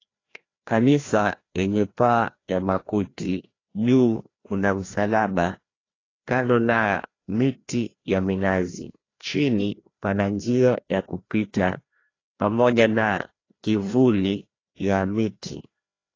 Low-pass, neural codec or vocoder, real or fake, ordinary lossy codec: 7.2 kHz; codec, 16 kHz, 1 kbps, FreqCodec, larger model; fake; AAC, 32 kbps